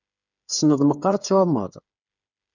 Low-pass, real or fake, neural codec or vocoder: 7.2 kHz; fake; codec, 16 kHz, 8 kbps, FreqCodec, smaller model